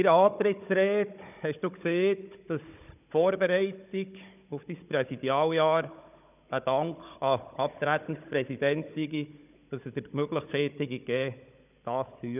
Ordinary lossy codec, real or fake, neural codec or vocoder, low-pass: none; fake; codec, 16 kHz, 16 kbps, FunCodec, trained on Chinese and English, 50 frames a second; 3.6 kHz